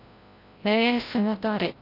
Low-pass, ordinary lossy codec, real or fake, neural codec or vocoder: 5.4 kHz; AAC, 32 kbps; fake; codec, 16 kHz, 0.5 kbps, FreqCodec, larger model